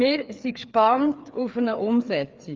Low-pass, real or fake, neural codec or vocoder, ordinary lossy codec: 7.2 kHz; fake; codec, 16 kHz, 4 kbps, FreqCodec, larger model; Opus, 32 kbps